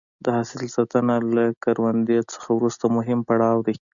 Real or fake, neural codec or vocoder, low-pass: real; none; 7.2 kHz